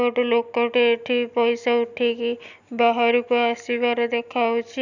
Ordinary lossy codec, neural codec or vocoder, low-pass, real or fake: none; none; 7.2 kHz; real